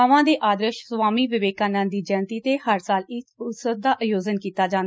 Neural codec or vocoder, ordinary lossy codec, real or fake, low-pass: none; none; real; none